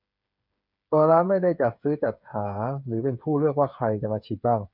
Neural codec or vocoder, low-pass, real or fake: codec, 16 kHz, 8 kbps, FreqCodec, smaller model; 5.4 kHz; fake